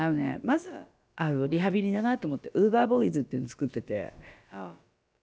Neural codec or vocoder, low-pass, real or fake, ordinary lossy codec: codec, 16 kHz, about 1 kbps, DyCAST, with the encoder's durations; none; fake; none